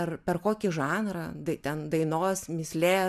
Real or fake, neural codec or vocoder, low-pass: real; none; 14.4 kHz